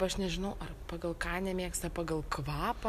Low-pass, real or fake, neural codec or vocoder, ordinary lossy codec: 14.4 kHz; real; none; AAC, 96 kbps